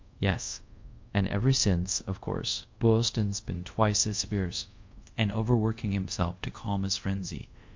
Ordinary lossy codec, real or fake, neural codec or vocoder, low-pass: MP3, 48 kbps; fake; codec, 24 kHz, 0.5 kbps, DualCodec; 7.2 kHz